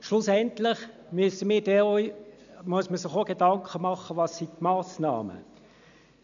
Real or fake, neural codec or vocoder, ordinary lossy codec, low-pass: real; none; MP3, 96 kbps; 7.2 kHz